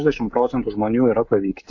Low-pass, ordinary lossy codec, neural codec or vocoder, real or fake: 7.2 kHz; AAC, 48 kbps; codec, 16 kHz, 16 kbps, FreqCodec, smaller model; fake